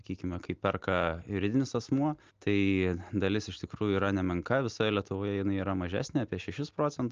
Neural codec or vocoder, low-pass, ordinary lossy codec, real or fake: none; 7.2 kHz; Opus, 24 kbps; real